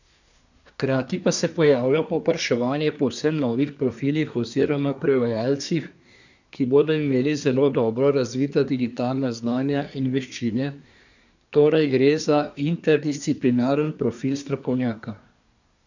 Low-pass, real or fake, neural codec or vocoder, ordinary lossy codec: 7.2 kHz; fake; codec, 24 kHz, 1 kbps, SNAC; none